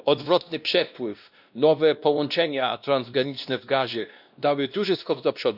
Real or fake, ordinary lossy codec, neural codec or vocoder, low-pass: fake; none; codec, 16 kHz, 1 kbps, X-Codec, WavLM features, trained on Multilingual LibriSpeech; 5.4 kHz